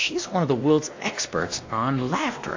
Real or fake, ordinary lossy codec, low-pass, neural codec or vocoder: fake; AAC, 48 kbps; 7.2 kHz; codec, 16 kHz, 1 kbps, X-Codec, WavLM features, trained on Multilingual LibriSpeech